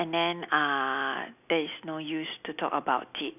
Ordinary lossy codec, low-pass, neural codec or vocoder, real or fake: none; 3.6 kHz; none; real